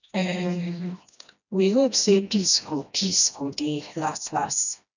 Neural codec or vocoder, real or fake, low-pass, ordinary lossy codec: codec, 16 kHz, 1 kbps, FreqCodec, smaller model; fake; 7.2 kHz; none